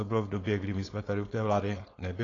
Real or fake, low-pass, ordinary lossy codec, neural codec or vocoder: fake; 7.2 kHz; AAC, 32 kbps; codec, 16 kHz, 4.8 kbps, FACodec